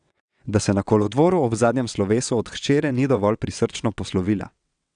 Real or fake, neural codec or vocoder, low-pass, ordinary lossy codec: fake; vocoder, 22.05 kHz, 80 mel bands, WaveNeXt; 9.9 kHz; none